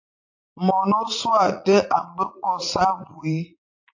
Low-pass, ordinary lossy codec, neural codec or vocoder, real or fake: 7.2 kHz; AAC, 48 kbps; vocoder, 44.1 kHz, 80 mel bands, Vocos; fake